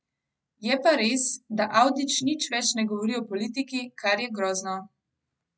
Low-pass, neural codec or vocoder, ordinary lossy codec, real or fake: none; none; none; real